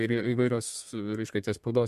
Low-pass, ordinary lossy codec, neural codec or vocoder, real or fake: 14.4 kHz; MP3, 64 kbps; codec, 32 kHz, 1.9 kbps, SNAC; fake